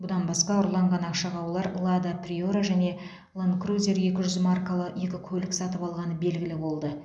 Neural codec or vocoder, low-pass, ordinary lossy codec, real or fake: none; none; none; real